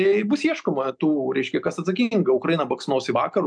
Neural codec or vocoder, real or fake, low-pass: none; real; 9.9 kHz